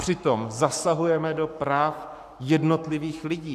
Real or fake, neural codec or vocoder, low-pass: fake; codec, 44.1 kHz, 7.8 kbps, Pupu-Codec; 14.4 kHz